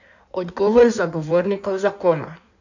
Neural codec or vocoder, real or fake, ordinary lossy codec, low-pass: codec, 16 kHz in and 24 kHz out, 1.1 kbps, FireRedTTS-2 codec; fake; none; 7.2 kHz